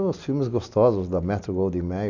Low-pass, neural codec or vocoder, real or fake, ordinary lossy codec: 7.2 kHz; none; real; none